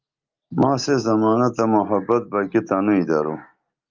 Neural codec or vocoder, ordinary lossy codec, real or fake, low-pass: none; Opus, 32 kbps; real; 7.2 kHz